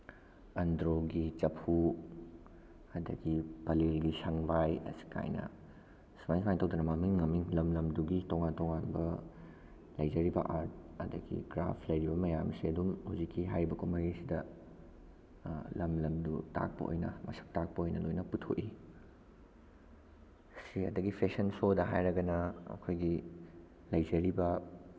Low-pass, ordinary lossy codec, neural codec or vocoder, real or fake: none; none; none; real